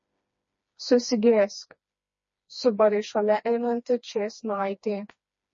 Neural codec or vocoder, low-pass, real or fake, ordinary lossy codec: codec, 16 kHz, 2 kbps, FreqCodec, smaller model; 7.2 kHz; fake; MP3, 32 kbps